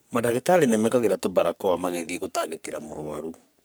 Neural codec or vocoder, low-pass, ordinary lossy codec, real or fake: codec, 44.1 kHz, 3.4 kbps, Pupu-Codec; none; none; fake